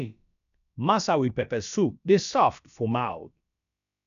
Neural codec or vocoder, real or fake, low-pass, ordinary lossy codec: codec, 16 kHz, about 1 kbps, DyCAST, with the encoder's durations; fake; 7.2 kHz; none